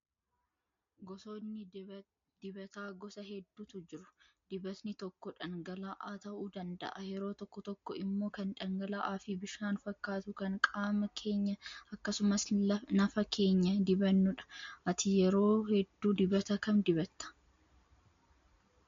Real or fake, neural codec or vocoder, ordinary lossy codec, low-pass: real; none; MP3, 48 kbps; 7.2 kHz